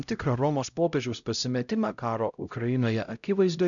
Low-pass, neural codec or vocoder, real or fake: 7.2 kHz; codec, 16 kHz, 0.5 kbps, X-Codec, HuBERT features, trained on LibriSpeech; fake